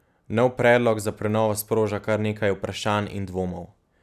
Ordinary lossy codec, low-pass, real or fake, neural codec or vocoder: none; 14.4 kHz; real; none